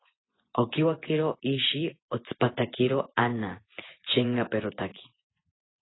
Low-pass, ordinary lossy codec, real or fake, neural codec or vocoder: 7.2 kHz; AAC, 16 kbps; fake; vocoder, 24 kHz, 100 mel bands, Vocos